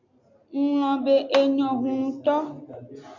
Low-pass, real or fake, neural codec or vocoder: 7.2 kHz; real; none